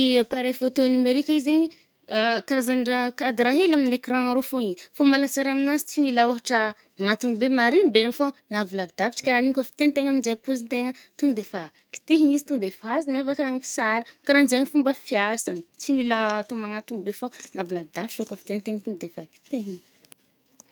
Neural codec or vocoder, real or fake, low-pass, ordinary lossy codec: codec, 44.1 kHz, 2.6 kbps, SNAC; fake; none; none